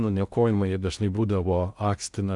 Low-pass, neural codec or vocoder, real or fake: 10.8 kHz; codec, 16 kHz in and 24 kHz out, 0.6 kbps, FocalCodec, streaming, 2048 codes; fake